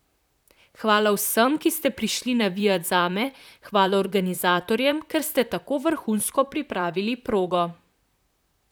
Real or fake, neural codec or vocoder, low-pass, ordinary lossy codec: fake; vocoder, 44.1 kHz, 128 mel bands, Pupu-Vocoder; none; none